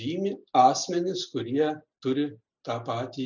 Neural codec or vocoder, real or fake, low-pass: none; real; 7.2 kHz